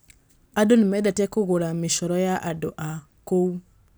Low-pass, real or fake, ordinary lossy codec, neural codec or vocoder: none; real; none; none